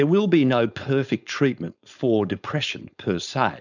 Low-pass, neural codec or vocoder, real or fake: 7.2 kHz; codec, 16 kHz, 4.8 kbps, FACodec; fake